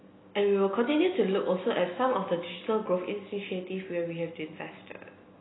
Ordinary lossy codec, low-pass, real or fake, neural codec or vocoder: AAC, 16 kbps; 7.2 kHz; real; none